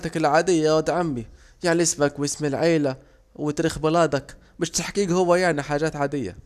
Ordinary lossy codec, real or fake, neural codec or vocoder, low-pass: none; real; none; 14.4 kHz